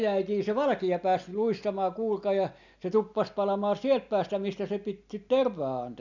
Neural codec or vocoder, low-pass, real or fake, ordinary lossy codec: none; 7.2 kHz; real; none